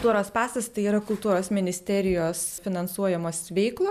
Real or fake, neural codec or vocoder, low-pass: real; none; 14.4 kHz